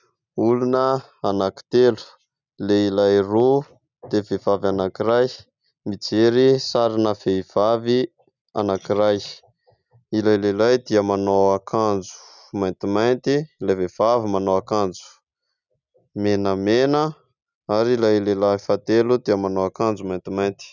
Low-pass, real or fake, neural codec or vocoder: 7.2 kHz; real; none